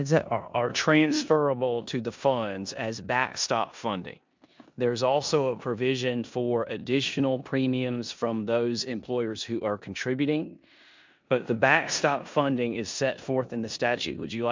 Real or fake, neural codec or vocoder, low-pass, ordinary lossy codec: fake; codec, 16 kHz in and 24 kHz out, 0.9 kbps, LongCat-Audio-Codec, four codebook decoder; 7.2 kHz; MP3, 64 kbps